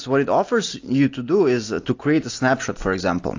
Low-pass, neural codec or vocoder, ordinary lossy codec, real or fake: 7.2 kHz; none; AAC, 48 kbps; real